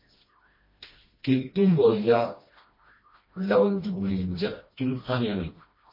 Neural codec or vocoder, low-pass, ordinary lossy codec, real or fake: codec, 16 kHz, 1 kbps, FreqCodec, smaller model; 5.4 kHz; MP3, 24 kbps; fake